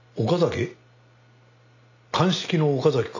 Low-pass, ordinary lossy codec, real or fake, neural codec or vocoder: 7.2 kHz; none; real; none